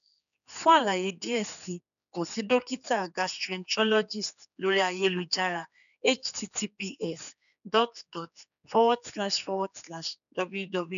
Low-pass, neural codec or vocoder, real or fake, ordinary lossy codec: 7.2 kHz; codec, 16 kHz, 4 kbps, X-Codec, HuBERT features, trained on general audio; fake; none